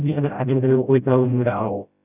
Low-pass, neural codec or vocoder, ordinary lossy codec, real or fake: 3.6 kHz; codec, 16 kHz, 0.5 kbps, FreqCodec, smaller model; none; fake